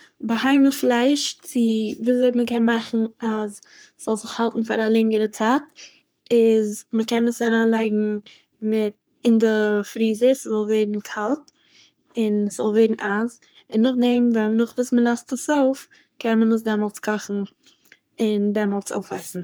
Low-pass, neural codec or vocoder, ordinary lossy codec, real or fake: none; codec, 44.1 kHz, 3.4 kbps, Pupu-Codec; none; fake